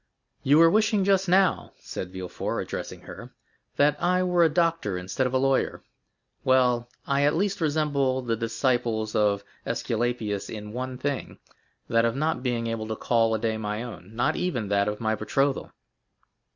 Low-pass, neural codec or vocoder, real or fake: 7.2 kHz; none; real